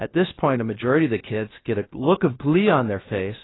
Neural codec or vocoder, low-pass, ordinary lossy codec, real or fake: codec, 16 kHz, 0.7 kbps, FocalCodec; 7.2 kHz; AAC, 16 kbps; fake